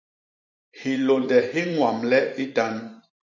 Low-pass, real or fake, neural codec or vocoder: 7.2 kHz; fake; vocoder, 44.1 kHz, 128 mel bands every 512 samples, BigVGAN v2